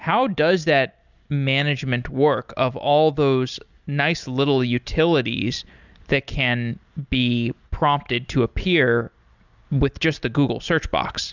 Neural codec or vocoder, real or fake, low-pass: none; real; 7.2 kHz